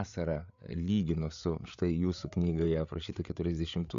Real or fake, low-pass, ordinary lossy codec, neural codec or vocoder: fake; 7.2 kHz; AAC, 96 kbps; codec, 16 kHz, 8 kbps, FreqCodec, larger model